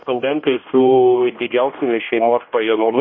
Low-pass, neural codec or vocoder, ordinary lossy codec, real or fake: 7.2 kHz; codec, 16 kHz, 1 kbps, X-Codec, HuBERT features, trained on general audio; MP3, 32 kbps; fake